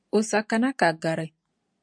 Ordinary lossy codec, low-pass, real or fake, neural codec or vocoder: MP3, 64 kbps; 9.9 kHz; real; none